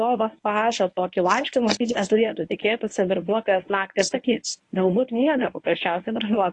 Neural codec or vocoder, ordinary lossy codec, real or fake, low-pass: codec, 24 kHz, 0.9 kbps, WavTokenizer, medium speech release version 1; AAC, 48 kbps; fake; 10.8 kHz